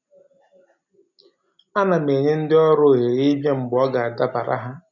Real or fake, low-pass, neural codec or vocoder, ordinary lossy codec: real; 7.2 kHz; none; none